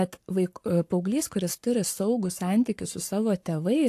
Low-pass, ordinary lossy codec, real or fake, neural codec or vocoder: 14.4 kHz; AAC, 64 kbps; fake; codec, 44.1 kHz, 7.8 kbps, Pupu-Codec